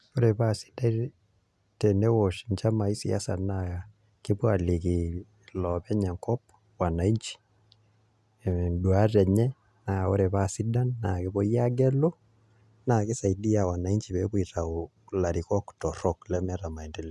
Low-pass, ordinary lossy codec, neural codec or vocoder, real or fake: none; none; none; real